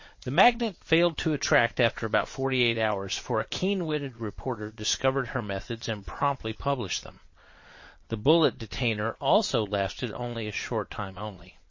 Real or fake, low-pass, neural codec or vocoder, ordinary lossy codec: fake; 7.2 kHz; vocoder, 22.05 kHz, 80 mel bands, Vocos; MP3, 32 kbps